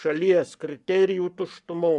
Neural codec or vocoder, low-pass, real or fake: codec, 44.1 kHz, 7.8 kbps, Pupu-Codec; 10.8 kHz; fake